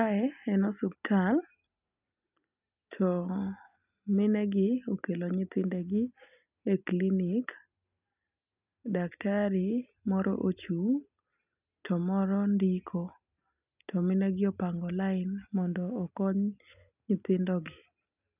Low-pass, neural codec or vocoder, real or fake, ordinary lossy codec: 3.6 kHz; none; real; none